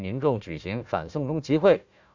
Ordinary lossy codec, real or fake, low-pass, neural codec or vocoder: MP3, 64 kbps; fake; 7.2 kHz; codec, 16 kHz, 1 kbps, FunCodec, trained on Chinese and English, 50 frames a second